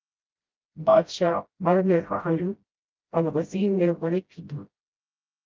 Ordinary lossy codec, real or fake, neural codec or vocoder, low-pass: Opus, 24 kbps; fake; codec, 16 kHz, 0.5 kbps, FreqCodec, smaller model; 7.2 kHz